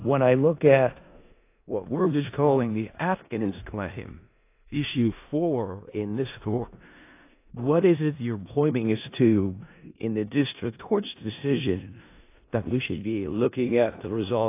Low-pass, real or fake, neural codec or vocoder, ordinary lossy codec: 3.6 kHz; fake; codec, 16 kHz in and 24 kHz out, 0.4 kbps, LongCat-Audio-Codec, four codebook decoder; AAC, 24 kbps